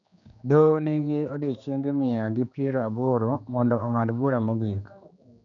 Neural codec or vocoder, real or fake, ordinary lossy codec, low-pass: codec, 16 kHz, 2 kbps, X-Codec, HuBERT features, trained on general audio; fake; none; 7.2 kHz